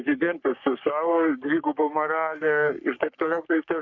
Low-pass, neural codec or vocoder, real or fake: 7.2 kHz; codec, 44.1 kHz, 3.4 kbps, Pupu-Codec; fake